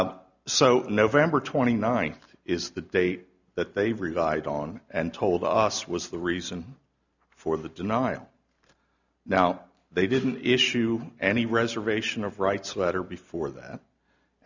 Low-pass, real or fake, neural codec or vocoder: 7.2 kHz; real; none